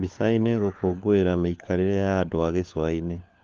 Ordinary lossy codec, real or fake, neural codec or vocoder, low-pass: Opus, 32 kbps; fake; codec, 16 kHz, 4 kbps, FunCodec, trained on LibriTTS, 50 frames a second; 7.2 kHz